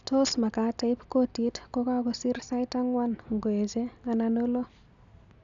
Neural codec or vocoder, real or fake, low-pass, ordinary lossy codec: none; real; 7.2 kHz; none